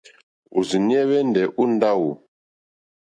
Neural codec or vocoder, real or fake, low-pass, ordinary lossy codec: none; real; 9.9 kHz; AAC, 64 kbps